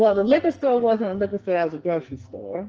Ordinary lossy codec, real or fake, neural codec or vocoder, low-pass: Opus, 24 kbps; fake; codec, 44.1 kHz, 2.6 kbps, SNAC; 7.2 kHz